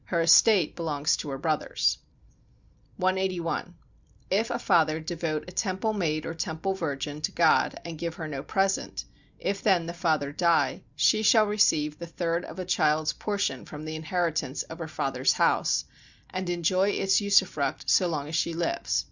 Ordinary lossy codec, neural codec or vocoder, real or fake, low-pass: Opus, 64 kbps; none; real; 7.2 kHz